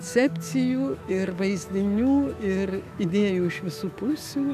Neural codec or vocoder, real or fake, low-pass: autoencoder, 48 kHz, 128 numbers a frame, DAC-VAE, trained on Japanese speech; fake; 14.4 kHz